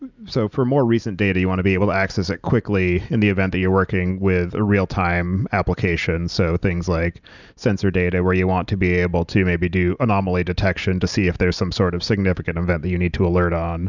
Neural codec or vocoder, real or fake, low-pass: none; real; 7.2 kHz